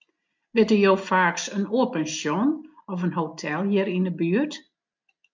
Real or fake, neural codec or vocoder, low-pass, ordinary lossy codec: real; none; 7.2 kHz; MP3, 64 kbps